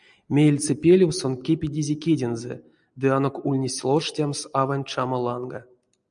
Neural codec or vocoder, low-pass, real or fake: none; 9.9 kHz; real